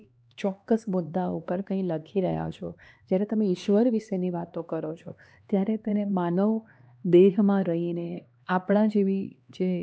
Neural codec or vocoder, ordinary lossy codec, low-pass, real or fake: codec, 16 kHz, 2 kbps, X-Codec, HuBERT features, trained on LibriSpeech; none; none; fake